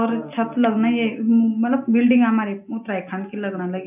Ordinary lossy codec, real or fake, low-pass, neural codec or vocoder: AAC, 32 kbps; real; 3.6 kHz; none